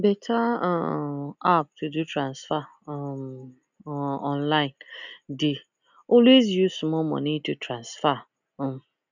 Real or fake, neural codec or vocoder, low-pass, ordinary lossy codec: real; none; 7.2 kHz; none